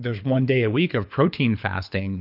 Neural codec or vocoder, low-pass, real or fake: codec, 24 kHz, 6 kbps, HILCodec; 5.4 kHz; fake